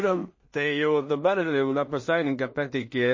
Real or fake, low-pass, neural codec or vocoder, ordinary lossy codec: fake; 7.2 kHz; codec, 16 kHz in and 24 kHz out, 0.4 kbps, LongCat-Audio-Codec, two codebook decoder; MP3, 32 kbps